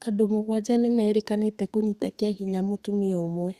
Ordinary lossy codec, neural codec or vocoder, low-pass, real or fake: Opus, 64 kbps; codec, 32 kHz, 1.9 kbps, SNAC; 14.4 kHz; fake